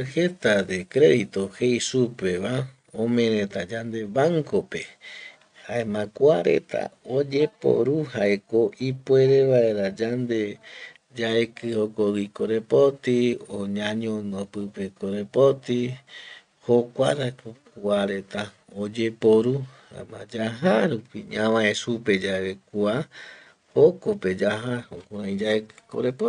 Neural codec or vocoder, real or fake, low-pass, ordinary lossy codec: none; real; 9.9 kHz; none